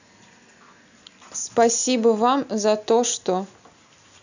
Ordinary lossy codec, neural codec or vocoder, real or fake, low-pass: none; none; real; 7.2 kHz